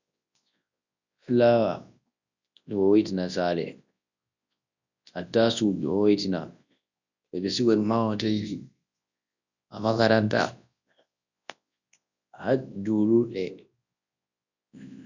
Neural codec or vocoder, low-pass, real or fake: codec, 24 kHz, 0.9 kbps, WavTokenizer, large speech release; 7.2 kHz; fake